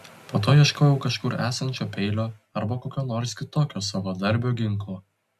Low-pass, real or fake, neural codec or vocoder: 14.4 kHz; real; none